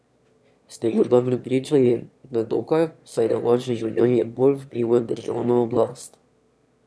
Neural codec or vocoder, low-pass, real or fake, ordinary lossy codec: autoencoder, 22.05 kHz, a latent of 192 numbers a frame, VITS, trained on one speaker; none; fake; none